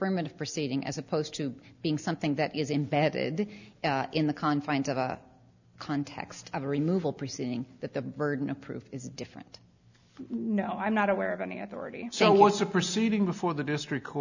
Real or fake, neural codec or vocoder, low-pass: real; none; 7.2 kHz